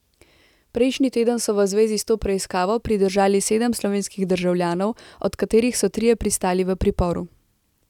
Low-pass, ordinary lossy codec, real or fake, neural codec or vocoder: 19.8 kHz; none; real; none